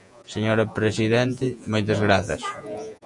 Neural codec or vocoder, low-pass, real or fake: vocoder, 48 kHz, 128 mel bands, Vocos; 10.8 kHz; fake